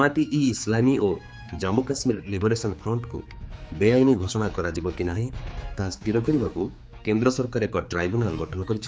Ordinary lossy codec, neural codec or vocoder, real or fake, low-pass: none; codec, 16 kHz, 4 kbps, X-Codec, HuBERT features, trained on general audio; fake; none